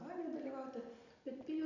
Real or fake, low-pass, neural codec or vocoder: real; 7.2 kHz; none